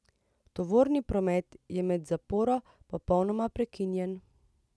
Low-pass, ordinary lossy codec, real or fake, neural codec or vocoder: none; none; real; none